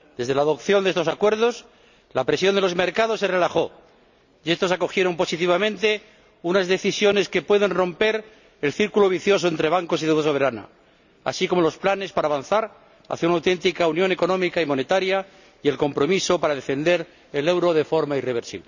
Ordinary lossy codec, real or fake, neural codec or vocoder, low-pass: none; real; none; 7.2 kHz